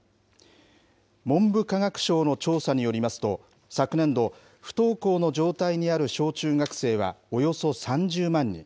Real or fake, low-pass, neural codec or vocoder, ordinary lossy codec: real; none; none; none